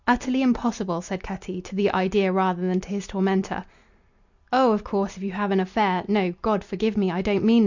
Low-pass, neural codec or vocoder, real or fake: 7.2 kHz; none; real